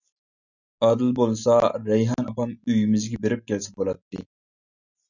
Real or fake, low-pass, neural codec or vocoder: real; 7.2 kHz; none